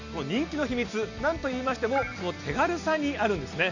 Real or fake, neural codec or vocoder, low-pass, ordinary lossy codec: real; none; 7.2 kHz; none